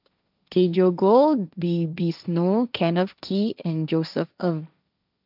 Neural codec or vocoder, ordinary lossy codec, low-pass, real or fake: codec, 16 kHz, 1.1 kbps, Voila-Tokenizer; none; 5.4 kHz; fake